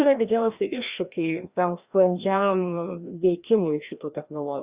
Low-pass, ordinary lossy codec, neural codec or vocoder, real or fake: 3.6 kHz; Opus, 64 kbps; codec, 16 kHz, 1 kbps, FreqCodec, larger model; fake